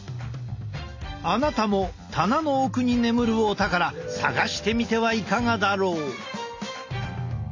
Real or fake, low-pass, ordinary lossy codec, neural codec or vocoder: real; 7.2 kHz; AAC, 48 kbps; none